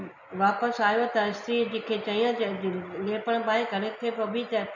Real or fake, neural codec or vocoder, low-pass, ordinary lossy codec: real; none; 7.2 kHz; none